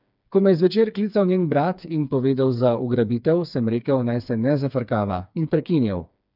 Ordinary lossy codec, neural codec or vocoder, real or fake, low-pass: none; codec, 16 kHz, 4 kbps, FreqCodec, smaller model; fake; 5.4 kHz